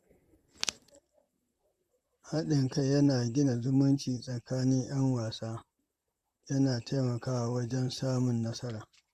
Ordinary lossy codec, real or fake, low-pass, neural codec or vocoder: Opus, 64 kbps; fake; 14.4 kHz; vocoder, 44.1 kHz, 128 mel bands, Pupu-Vocoder